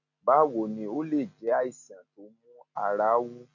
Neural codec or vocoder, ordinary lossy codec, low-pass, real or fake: none; none; 7.2 kHz; real